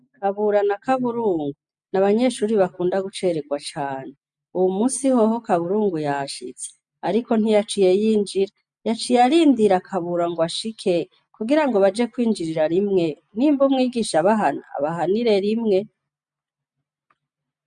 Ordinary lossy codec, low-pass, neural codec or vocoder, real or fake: MP3, 64 kbps; 9.9 kHz; none; real